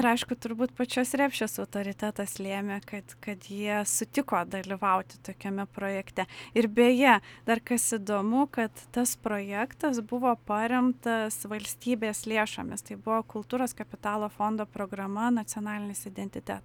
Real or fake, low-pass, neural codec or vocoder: real; 19.8 kHz; none